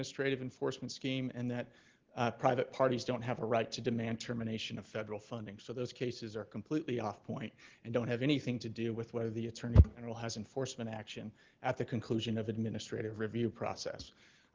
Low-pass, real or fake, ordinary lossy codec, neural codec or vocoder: 7.2 kHz; real; Opus, 16 kbps; none